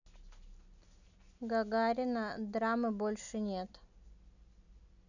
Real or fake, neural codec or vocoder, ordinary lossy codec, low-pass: real; none; none; 7.2 kHz